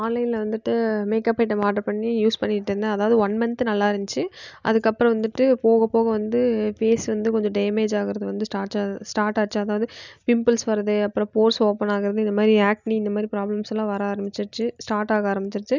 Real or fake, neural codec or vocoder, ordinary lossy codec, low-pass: real; none; none; 7.2 kHz